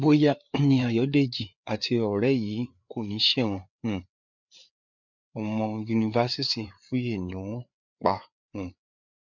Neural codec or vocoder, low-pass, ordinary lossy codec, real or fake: codec, 16 kHz, 4 kbps, FreqCodec, larger model; 7.2 kHz; none; fake